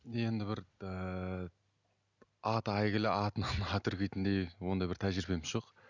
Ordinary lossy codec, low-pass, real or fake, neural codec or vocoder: none; 7.2 kHz; real; none